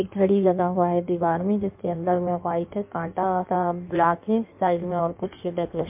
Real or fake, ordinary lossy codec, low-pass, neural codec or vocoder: fake; MP3, 32 kbps; 3.6 kHz; codec, 16 kHz in and 24 kHz out, 1.1 kbps, FireRedTTS-2 codec